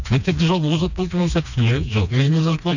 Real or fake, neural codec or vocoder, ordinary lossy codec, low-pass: fake; codec, 16 kHz, 2 kbps, FreqCodec, smaller model; none; 7.2 kHz